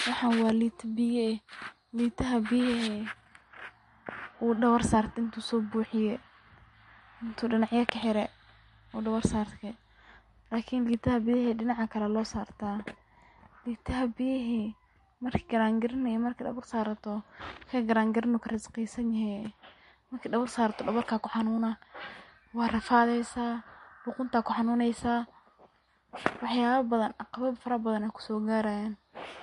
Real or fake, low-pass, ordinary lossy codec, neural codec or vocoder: real; 14.4 kHz; MP3, 48 kbps; none